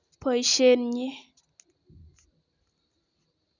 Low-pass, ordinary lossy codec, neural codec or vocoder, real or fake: 7.2 kHz; none; none; real